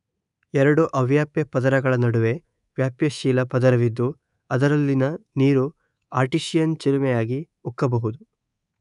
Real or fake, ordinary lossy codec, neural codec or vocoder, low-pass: fake; none; codec, 24 kHz, 3.1 kbps, DualCodec; 10.8 kHz